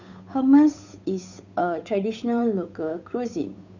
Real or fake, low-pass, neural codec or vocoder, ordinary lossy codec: fake; 7.2 kHz; codec, 16 kHz, 8 kbps, FunCodec, trained on Chinese and English, 25 frames a second; none